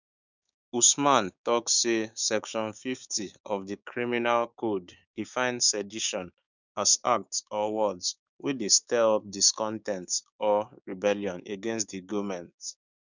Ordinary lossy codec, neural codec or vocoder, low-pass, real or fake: none; codec, 16 kHz, 6 kbps, DAC; 7.2 kHz; fake